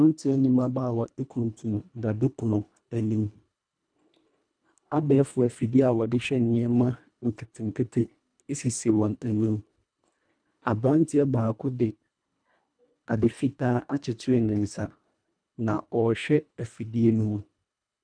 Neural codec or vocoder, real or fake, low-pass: codec, 24 kHz, 1.5 kbps, HILCodec; fake; 9.9 kHz